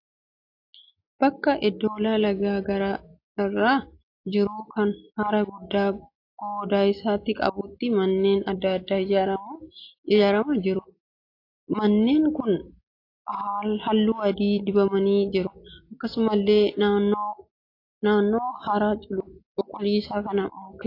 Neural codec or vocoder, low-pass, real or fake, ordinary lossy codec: none; 5.4 kHz; real; AAC, 32 kbps